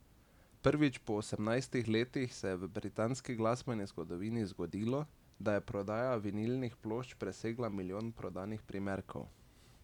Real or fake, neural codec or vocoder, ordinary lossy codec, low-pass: real; none; none; 19.8 kHz